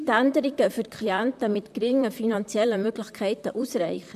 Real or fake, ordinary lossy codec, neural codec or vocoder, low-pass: fake; MP3, 96 kbps; vocoder, 44.1 kHz, 128 mel bands, Pupu-Vocoder; 14.4 kHz